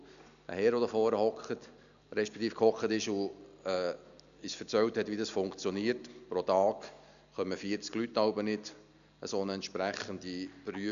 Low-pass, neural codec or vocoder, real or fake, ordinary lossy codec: 7.2 kHz; none; real; none